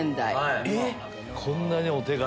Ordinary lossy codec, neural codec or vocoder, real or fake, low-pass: none; none; real; none